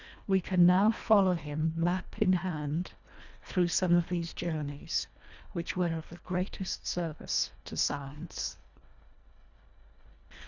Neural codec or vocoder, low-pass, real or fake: codec, 24 kHz, 1.5 kbps, HILCodec; 7.2 kHz; fake